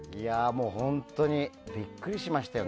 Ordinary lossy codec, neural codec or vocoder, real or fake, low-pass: none; none; real; none